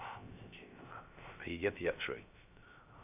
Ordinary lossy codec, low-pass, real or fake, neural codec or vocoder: none; 3.6 kHz; fake; codec, 16 kHz, 0.3 kbps, FocalCodec